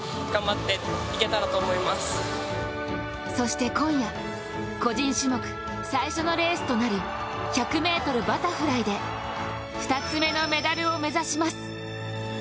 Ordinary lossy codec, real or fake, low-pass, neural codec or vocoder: none; real; none; none